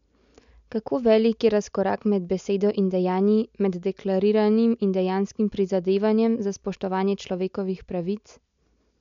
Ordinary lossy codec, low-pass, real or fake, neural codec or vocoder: MP3, 64 kbps; 7.2 kHz; real; none